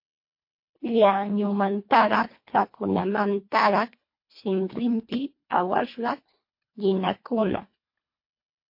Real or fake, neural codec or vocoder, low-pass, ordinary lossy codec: fake; codec, 24 kHz, 1.5 kbps, HILCodec; 5.4 kHz; MP3, 32 kbps